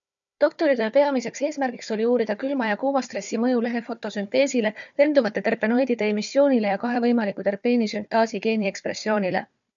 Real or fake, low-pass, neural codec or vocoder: fake; 7.2 kHz; codec, 16 kHz, 4 kbps, FunCodec, trained on Chinese and English, 50 frames a second